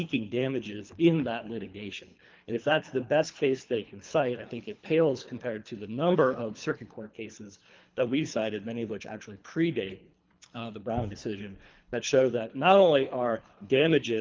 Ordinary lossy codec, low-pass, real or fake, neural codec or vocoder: Opus, 32 kbps; 7.2 kHz; fake; codec, 24 kHz, 3 kbps, HILCodec